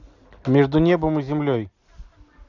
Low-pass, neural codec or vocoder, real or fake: 7.2 kHz; none; real